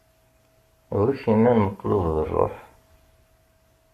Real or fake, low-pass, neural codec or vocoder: fake; 14.4 kHz; codec, 44.1 kHz, 7.8 kbps, Pupu-Codec